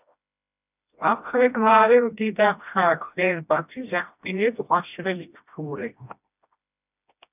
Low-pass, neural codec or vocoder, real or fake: 3.6 kHz; codec, 16 kHz, 1 kbps, FreqCodec, smaller model; fake